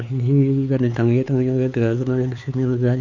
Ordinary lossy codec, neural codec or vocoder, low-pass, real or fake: none; codec, 16 kHz, 4 kbps, X-Codec, HuBERT features, trained on LibriSpeech; 7.2 kHz; fake